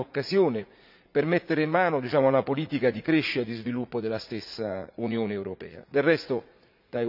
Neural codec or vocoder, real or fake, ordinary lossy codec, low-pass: vocoder, 44.1 kHz, 80 mel bands, Vocos; fake; none; 5.4 kHz